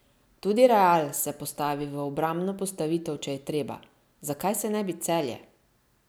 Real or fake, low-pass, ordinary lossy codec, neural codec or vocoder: real; none; none; none